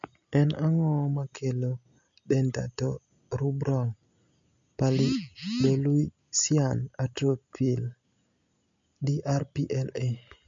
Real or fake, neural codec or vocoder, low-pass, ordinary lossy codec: real; none; 7.2 kHz; MP3, 48 kbps